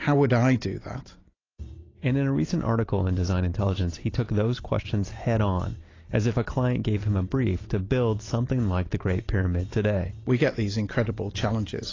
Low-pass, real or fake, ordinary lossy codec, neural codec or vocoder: 7.2 kHz; real; AAC, 32 kbps; none